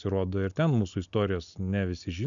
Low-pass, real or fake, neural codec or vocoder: 7.2 kHz; real; none